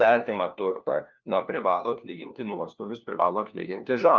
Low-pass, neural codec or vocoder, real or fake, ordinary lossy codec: 7.2 kHz; codec, 16 kHz, 1 kbps, FunCodec, trained on LibriTTS, 50 frames a second; fake; Opus, 24 kbps